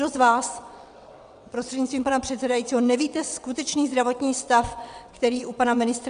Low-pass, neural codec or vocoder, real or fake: 9.9 kHz; vocoder, 22.05 kHz, 80 mel bands, WaveNeXt; fake